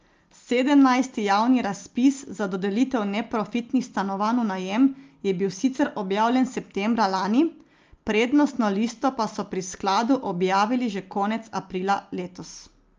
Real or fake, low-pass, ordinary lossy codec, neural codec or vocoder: real; 7.2 kHz; Opus, 24 kbps; none